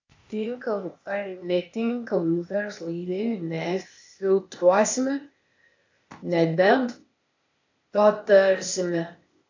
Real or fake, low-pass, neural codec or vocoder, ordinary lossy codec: fake; 7.2 kHz; codec, 16 kHz, 0.8 kbps, ZipCodec; AAC, 48 kbps